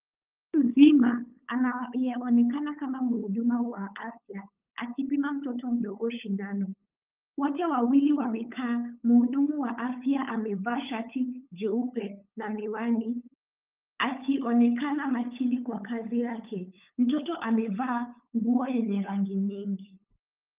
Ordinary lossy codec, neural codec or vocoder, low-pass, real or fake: Opus, 24 kbps; codec, 16 kHz, 8 kbps, FunCodec, trained on LibriTTS, 25 frames a second; 3.6 kHz; fake